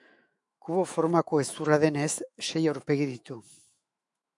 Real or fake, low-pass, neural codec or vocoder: fake; 10.8 kHz; autoencoder, 48 kHz, 128 numbers a frame, DAC-VAE, trained on Japanese speech